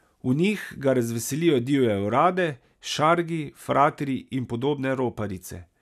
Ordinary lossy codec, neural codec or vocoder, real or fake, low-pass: none; none; real; 14.4 kHz